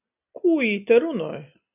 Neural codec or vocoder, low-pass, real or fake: none; 3.6 kHz; real